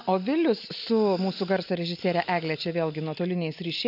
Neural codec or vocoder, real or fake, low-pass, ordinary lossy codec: none; real; 5.4 kHz; AAC, 48 kbps